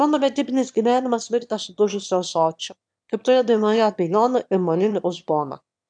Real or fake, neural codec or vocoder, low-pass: fake; autoencoder, 22.05 kHz, a latent of 192 numbers a frame, VITS, trained on one speaker; 9.9 kHz